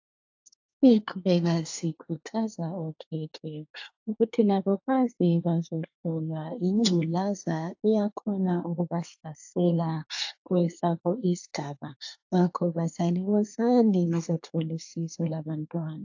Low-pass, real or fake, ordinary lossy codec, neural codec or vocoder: 7.2 kHz; fake; AAC, 48 kbps; codec, 24 kHz, 1 kbps, SNAC